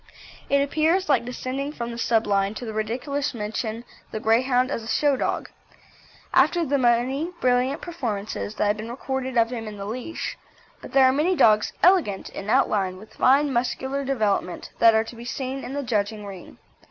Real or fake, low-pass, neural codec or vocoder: real; 7.2 kHz; none